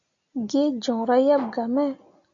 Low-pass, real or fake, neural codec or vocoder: 7.2 kHz; real; none